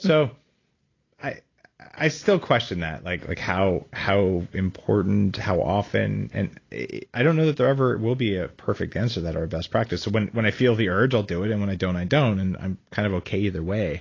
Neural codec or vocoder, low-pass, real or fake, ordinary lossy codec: none; 7.2 kHz; real; AAC, 32 kbps